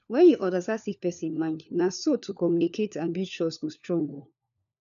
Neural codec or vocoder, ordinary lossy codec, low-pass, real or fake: codec, 16 kHz, 4 kbps, FunCodec, trained on LibriTTS, 50 frames a second; none; 7.2 kHz; fake